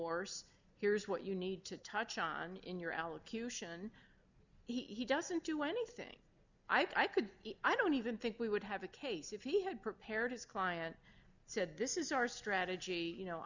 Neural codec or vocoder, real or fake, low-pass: none; real; 7.2 kHz